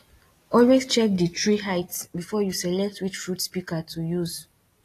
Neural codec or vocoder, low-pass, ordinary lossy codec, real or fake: none; 14.4 kHz; AAC, 48 kbps; real